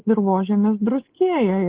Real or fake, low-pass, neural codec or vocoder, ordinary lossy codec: real; 3.6 kHz; none; Opus, 16 kbps